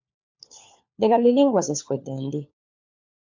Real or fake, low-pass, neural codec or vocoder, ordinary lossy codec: fake; 7.2 kHz; codec, 16 kHz, 4 kbps, FunCodec, trained on LibriTTS, 50 frames a second; MP3, 64 kbps